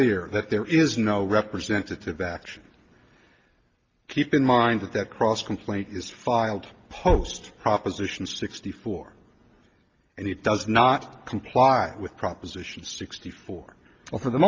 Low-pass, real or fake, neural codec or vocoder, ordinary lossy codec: 7.2 kHz; real; none; Opus, 24 kbps